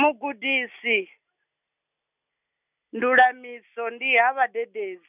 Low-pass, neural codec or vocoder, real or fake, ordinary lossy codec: 3.6 kHz; none; real; none